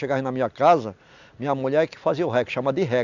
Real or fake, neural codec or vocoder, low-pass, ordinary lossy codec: real; none; 7.2 kHz; none